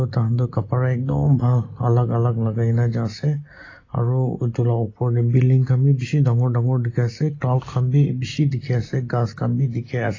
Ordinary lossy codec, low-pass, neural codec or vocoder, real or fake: AAC, 32 kbps; 7.2 kHz; none; real